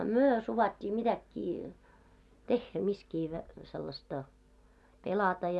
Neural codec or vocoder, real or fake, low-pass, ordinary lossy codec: none; real; none; none